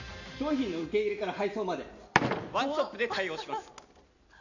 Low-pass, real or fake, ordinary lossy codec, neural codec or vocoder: 7.2 kHz; real; none; none